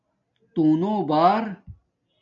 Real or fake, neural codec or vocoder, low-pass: real; none; 7.2 kHz